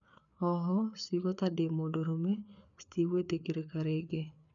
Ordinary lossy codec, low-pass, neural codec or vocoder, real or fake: none; 7.2 kHz; codec, 16 kHz, 4 kbps, FreqCodec, larger model; fake